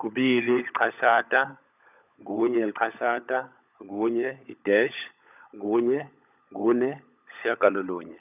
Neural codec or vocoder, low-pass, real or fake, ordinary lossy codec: codec, 16 kHz, 16 kbps, FunCodec, trained on LibriTTS, 50 frames a second; 3.6 kHz; fake; none